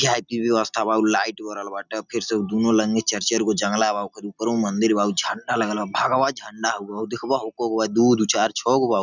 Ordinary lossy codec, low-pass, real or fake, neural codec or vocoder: none; 7.2 kHz; real; none